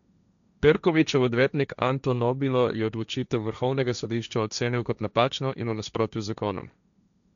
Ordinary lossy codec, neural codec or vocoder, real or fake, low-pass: none; codec, 16 kHz, 1.1 kbps, Voila-Tokenizer; fake; 7.2 kHz